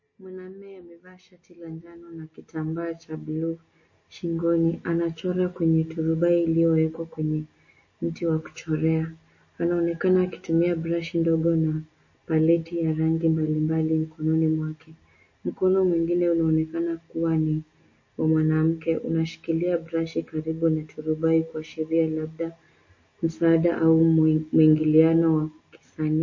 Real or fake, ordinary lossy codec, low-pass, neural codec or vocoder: real; MP3, 32 kbps; 7.2 kHz; none